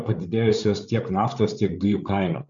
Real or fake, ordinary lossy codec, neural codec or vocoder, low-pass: fake; MP3, 64 kbps; codec, 16 kHz, 8 kbps, FreqCodec, larger model; 7.2 kHz